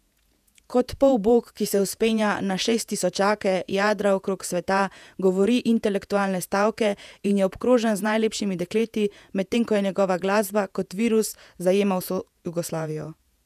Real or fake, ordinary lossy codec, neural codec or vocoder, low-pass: fake; none; vocoder, 48 kHz, 128 mel bands, Vocos; 14.4 kHz